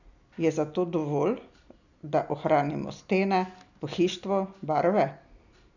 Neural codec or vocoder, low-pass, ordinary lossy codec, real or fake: none; 7.2 kHz; none; real